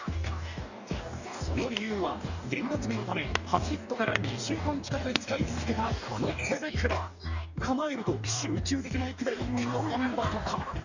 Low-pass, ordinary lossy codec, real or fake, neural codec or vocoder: 7.2 kHz; none; fake; codec, 44.1 kHz, 2.6 kbps, DAC